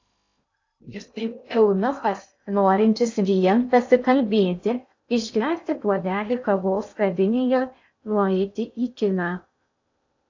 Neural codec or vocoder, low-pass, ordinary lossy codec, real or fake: codec, 16 kHz in and 24 kHz out, 0.6 kbps, FocalCodec, streaming, 2048 codes; 7.2 kHz; AAC, 48 kbps; fake